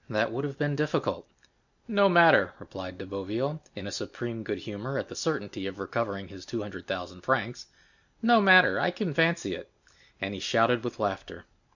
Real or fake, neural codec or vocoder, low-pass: real; none; 7.2 kHz